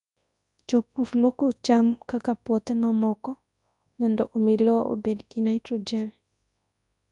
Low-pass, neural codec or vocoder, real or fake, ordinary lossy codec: 10.8 kHz; codec, 24 kHz, 0.9 kbps, WavTokenizer, large speech release; fake; none